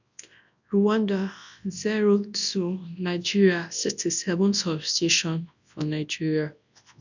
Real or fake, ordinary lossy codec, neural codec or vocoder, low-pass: fake; none; codec, 24 kHz, 0.9 kbps, WavTokenizer, large speech release; 7.2 kHz